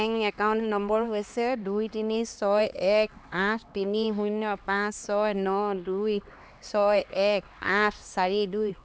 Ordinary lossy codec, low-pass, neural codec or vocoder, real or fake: none; none; codec, 16 kHz, 2 kbps, X-Codec, HuBERT features, trained on LibriSpeech; fake